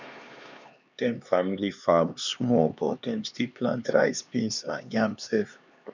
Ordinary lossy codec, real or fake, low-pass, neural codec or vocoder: none; fake; 7.2 kHz; codec, 16 kHz, 2 kbps, X-Codec, HuBERT features, trained on LibriSpeech